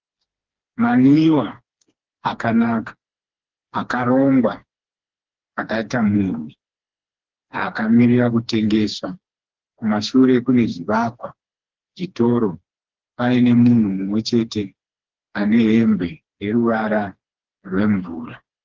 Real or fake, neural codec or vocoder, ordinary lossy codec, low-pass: fake; codec, 16 kHz, 2 kbps, FreqCodec, smaller model; Opus, 16 kbps; 7.2 kHz